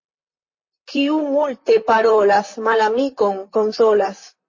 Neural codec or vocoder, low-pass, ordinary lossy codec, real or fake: vocoder, 44.1 kHz, 128 mel bands, Pupu-Vocoder; 7.2 kHz; MP3, 32 kbps; fake